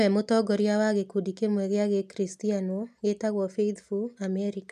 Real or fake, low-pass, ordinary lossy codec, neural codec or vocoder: real; 14.4 kHz; none; none